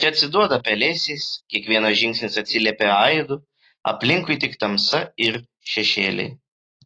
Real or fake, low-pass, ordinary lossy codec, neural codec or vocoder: real; 9.9 kHz; AAC, 32 kbps; none